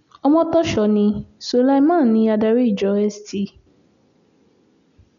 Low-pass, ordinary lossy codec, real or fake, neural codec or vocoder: 7.2 kHz; none; real; none